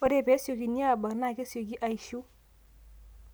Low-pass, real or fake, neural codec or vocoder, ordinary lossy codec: none; real; none; none